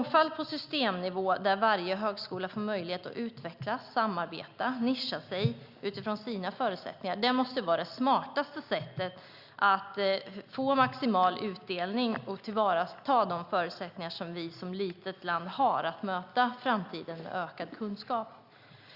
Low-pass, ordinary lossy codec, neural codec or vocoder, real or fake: 5.4 kHz; Opus, 64 kbps; none; real